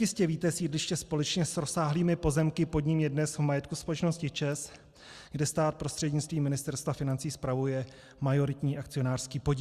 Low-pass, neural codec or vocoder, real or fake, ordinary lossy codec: 14.4 kHz; none; real; Opus, 64 kbps